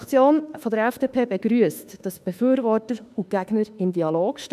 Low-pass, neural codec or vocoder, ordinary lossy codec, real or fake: 14.4 kHz; autoencoder, 48 kHz, 32 numbers a frame, DAC-VAE, trained on Japanese speech; none; fake